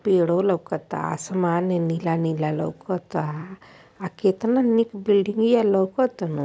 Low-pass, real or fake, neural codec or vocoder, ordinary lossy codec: none; real; none; none